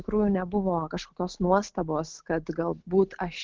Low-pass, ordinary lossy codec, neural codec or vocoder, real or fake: 7.2 kHz; Opus, 16 kbps; none; real